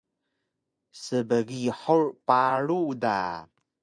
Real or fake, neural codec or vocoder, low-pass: fake; vocoder, 24 kHz, 100 mel bands, Vocos; 9.9 kHz